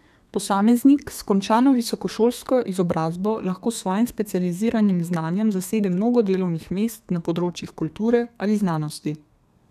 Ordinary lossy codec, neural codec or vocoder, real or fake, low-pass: none; codec, 32 kHz, 1.9 kbps, SNAC; fake; 14.4 kHz